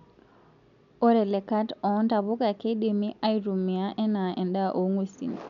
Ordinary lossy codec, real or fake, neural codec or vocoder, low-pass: none; real; none; 7.2 kHz